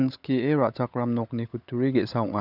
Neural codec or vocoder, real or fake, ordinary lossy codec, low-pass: codec, 16 kHz, 16 kbps, FunCodec, trained on LibriTTS, 50 frames a second; fake; none; 5.4 kHz